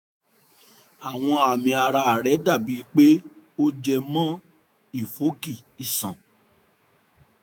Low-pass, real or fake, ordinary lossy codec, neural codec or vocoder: none; fake; none; autoencoder, 48 kHz, 128 numbers a frame, DAC-VAE, trained on Japanese speech